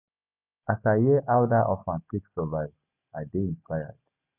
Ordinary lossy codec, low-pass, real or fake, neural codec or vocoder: none; 3.6 kHz; real; none